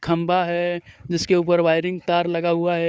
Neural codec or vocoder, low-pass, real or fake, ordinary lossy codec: codec, 16 kHz, 4 kbps, FunCodec, trained on Chinese and English, 50 frames a second; none; fake; none